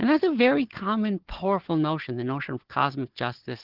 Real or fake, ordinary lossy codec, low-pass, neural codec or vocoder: fake; Opus, 16 kbps; 5.4 kHz; codec, 16 kHz, 4 kbps, FunCodec, trained on Chinese and English, 50 frames a second